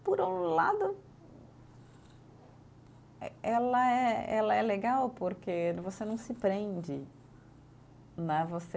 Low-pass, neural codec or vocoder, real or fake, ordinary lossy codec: none; none; real; none